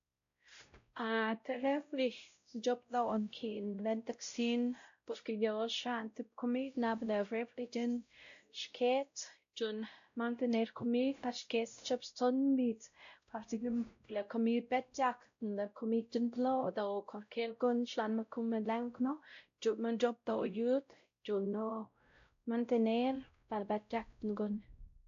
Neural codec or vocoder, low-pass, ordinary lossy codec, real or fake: codec, 16 kHz, 0.5 kbps, X-Codec, WavLM features, trained on Multilingual LibriSpeech; 7.2 kHz; AAC, 64 kbps; fake